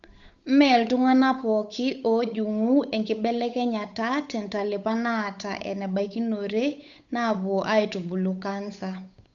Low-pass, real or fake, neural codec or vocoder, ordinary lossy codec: 7.2 kHz; fake; codec, 16 kHz, 8 kbps, FunCodec, trained on Chinese and English, 25 frames a second; none